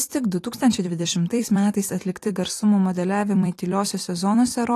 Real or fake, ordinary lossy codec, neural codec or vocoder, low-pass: fake; AAC, 48 kbps; vocoder, 44.1 kHz, 128 mel bands every 256 samples, BigVGAN v2; 14.4 kHz